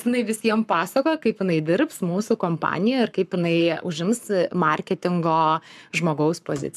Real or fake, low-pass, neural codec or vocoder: fake; 14.4 kHz; codec, 44.1 kHz, 7.8 kbps, Pupu-Codec